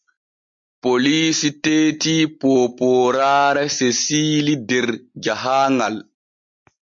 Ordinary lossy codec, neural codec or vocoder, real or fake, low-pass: MP3, 48 kbps; none; real; 7.2 kHz